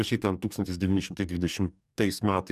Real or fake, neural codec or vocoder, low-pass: fake; codec, 44.1 kHz, 2.6 kbps, DAC; 14.4 kHz